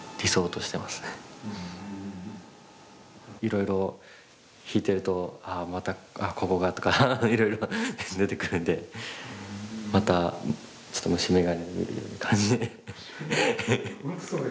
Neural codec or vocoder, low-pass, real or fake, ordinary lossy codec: none; none; real; none